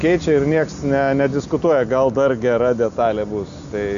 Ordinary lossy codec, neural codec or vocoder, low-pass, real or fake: AAC, 96 kbps; none; 7.2 kHz; real